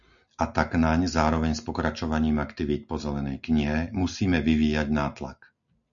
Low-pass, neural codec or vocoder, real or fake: 7.2 kHz; none; real